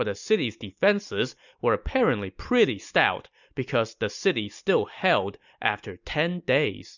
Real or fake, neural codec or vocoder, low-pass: real; none; 7.2 kHz